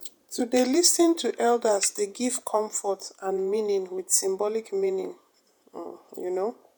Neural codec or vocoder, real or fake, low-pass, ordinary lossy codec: vocoder, 48 kHz, 128 mel bands, Vocos; fake; none; none